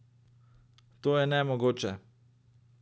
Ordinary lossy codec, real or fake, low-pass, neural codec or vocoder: none; real; none; none